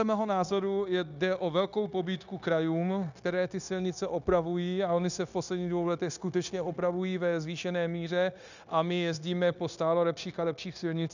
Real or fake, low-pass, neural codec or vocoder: fake; 7.2 kHz; codec, 16 kHz, 0.9 kbps, LongCat-Audio-Codec